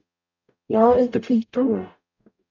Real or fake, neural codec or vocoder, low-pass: fake; codec, 44.1 kHz, 0.9 kbps, DAC; 7.2 kHz